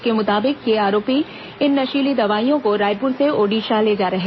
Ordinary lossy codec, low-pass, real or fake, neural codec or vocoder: MP3, 24 kbps; 7.2 kHz; real; none